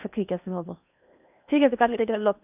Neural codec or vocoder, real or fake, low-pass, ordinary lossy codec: codec, 16 kHz in and 24 kHz out, 0.8 kbps, FocalCodec, streaming, 65536 codes; fake; 3.6 kHz; none